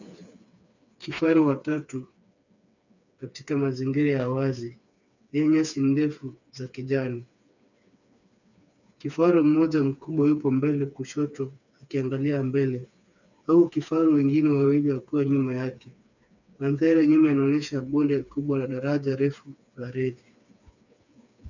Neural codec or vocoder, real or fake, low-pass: codec, 16 kHz, 4 kbps, FreqCodec, smaller model; fake; 7.2 kHz